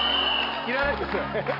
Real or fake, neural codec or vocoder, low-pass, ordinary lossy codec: real; none; 5.4 kHz; AAC, 48 kbps